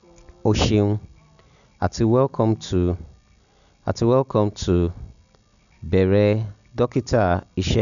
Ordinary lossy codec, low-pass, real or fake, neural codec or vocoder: none; 7.2 kHz; real; none